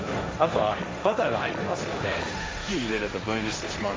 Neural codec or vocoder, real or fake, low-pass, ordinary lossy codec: codec, 16 kHz, 1.1 kbps, Voila-Tokenizer; fake; none; none